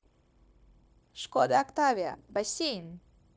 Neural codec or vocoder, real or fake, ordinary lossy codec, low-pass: codec, 16 kHz, 0.9 kbps, LongCat-Audio-Codec; fake; none; none